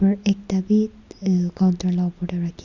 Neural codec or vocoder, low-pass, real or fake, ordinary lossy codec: none; 7.2 kHz; real; Opus, 64 kbps